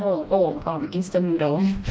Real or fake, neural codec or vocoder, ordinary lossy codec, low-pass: fake; codec, 16 kHz, 1 kbps, FreqCodec, smaller model; none; none